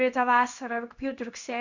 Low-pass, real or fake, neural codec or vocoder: 7.2 kHz; fake; codec, 16 kHz in and 24 kHz out, 1 kbps, XY-Tokenizer